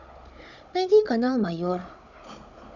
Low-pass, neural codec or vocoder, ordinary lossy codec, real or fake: 7.2 kHz; codec, 16 kHz, 16 kbps, FunCodec, trained on Chinese and English, 50 frames a second; none; fake